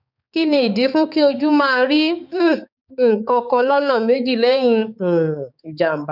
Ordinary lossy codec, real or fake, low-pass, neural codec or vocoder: none; fake; 5.4 kHz; codec, 16 kHz, 4 kbps, X-Codec, HuBERT features, trained on balanced general audio